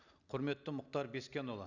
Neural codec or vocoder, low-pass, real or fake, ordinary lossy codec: none; 7.2 kHz; real; none